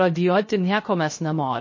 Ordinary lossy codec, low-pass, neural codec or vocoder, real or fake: MP3, 32 kbps; 7.2 kHz; codec, 16 kHz, 0.3 kbps, FocalCodec; fake